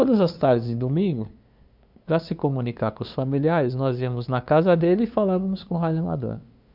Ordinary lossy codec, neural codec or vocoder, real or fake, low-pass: none; codec, 16 kHz, 2 kbps, FunCodec, trained on LibriTTS, 25 frames a second; fake; 5.4 kHz